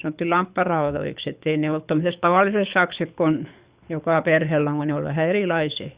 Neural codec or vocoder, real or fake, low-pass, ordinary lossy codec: codec, 24 kHz, 6 kbps, HILCodec; fake; 3.6 kHz; Opus, 64 kbps